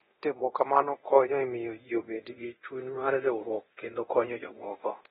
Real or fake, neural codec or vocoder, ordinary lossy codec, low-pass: fake; codec, 24 kHz, 0.9 kbps, DualCodec; AAC, 16 kbps; 10.8 kHz